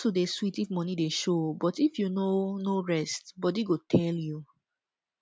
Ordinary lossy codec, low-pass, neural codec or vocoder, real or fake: none; none; none; real